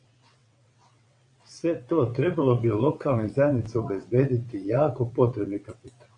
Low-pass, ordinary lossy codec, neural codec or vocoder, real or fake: 9.9 kHz; MP3, 48 kbps; vocoder, 22.05 kHz, 80 mel bands, WaveNeXt; fake